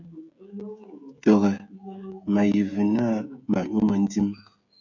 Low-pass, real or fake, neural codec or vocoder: 7.2 kHz; fake; codec, 16 kHz, 16 kbps, FreqCodec, smaller model